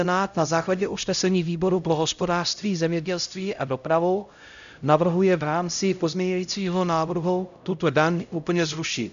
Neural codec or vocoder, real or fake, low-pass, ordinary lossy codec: codec, 16 kHz, 0.5 kbps, X-Codec, HuBERT features, trained on LibriSpeech; fake; 7.2 kHz; AAC, 96 kbps